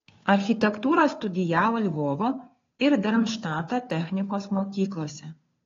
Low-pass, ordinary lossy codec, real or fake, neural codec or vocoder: 7.2 kHz; AAC, 32 kbps; fake; codec, 16 kHz, 2 kbps, FunCodec, trained on Chinese and English, 25 frames a second